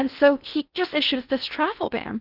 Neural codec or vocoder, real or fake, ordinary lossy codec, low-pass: codec, 16 kHz in and 24 kHz out, 0.8 kbps, FocalCodec, streaming, 65536 codes; fake; Opus, 24 kbps; 5.4 kHz